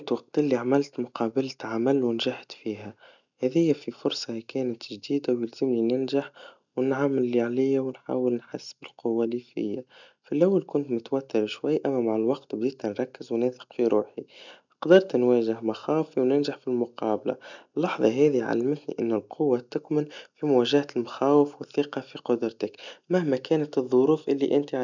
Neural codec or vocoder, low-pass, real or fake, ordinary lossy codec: none; 7.2 kHz; real; none